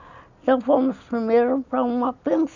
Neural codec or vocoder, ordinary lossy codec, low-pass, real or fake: none; none; 7.2 kHz; real